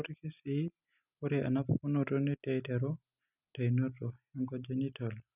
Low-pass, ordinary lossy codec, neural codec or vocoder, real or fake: 3.6 kHz; none; none; real